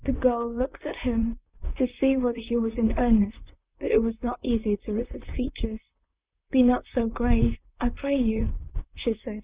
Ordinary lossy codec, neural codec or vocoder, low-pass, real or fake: Opus, 64 kbps; vocoder, 44.1 kHz, 128 mel bands, Pupu-Vocoder; 3.6 kHz; fake